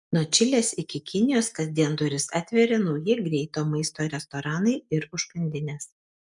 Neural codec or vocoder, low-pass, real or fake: none; 10.8 kHz; real